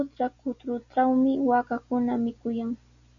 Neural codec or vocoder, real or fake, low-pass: none; real; 7.2 kHz